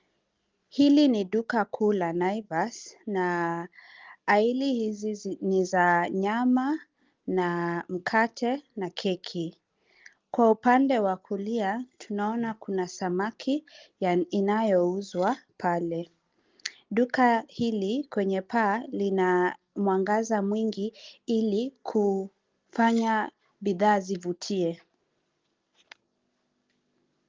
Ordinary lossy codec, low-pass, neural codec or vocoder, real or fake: Opus, 32 kbps; 7.2 kHz; none; real